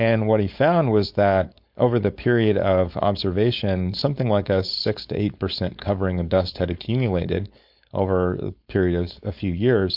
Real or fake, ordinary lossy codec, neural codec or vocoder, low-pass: fake; MP3, 48 kbps; codec, 16 kHz, 4.8 kbps, FACodec; 5.4 kHz